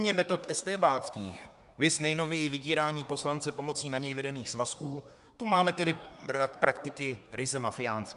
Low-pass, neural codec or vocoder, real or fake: 10.8 kHz; codec, 24 kHz, 1 kbps, SNAC; fake